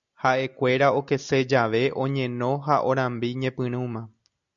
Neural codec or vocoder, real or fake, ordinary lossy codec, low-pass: none; real; MP3, 96 kbps; 7.2 kHz